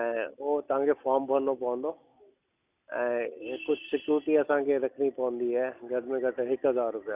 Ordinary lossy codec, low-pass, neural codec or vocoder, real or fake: Opus, 64 kbps; 3.6 kHz; none; real